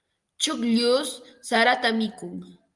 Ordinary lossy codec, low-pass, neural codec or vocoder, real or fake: Opus, 32 kbps; 10.8 kHz; none; real